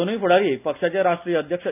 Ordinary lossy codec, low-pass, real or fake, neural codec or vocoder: none; 3.6 kHz; real; none